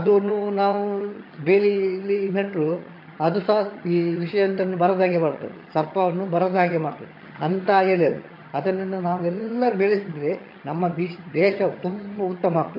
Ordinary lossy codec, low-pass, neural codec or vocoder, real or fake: MP3, 32 kbps; 5.4 kHz; vocoder, 22.05 kHz, 80 mel bands, HiFi-GAN; fake